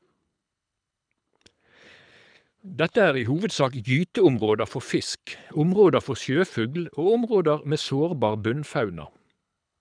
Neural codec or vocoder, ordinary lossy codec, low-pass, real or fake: codec, 24 kHz, 6 kbps, HILCodec; none; 9.9 kHz; fake